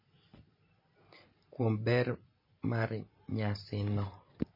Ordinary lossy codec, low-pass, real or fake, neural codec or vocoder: MP3, 24 kbps; 5.4 kHz; real; none